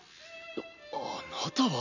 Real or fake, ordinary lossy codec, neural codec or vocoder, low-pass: real; none; none; 7.2 kHz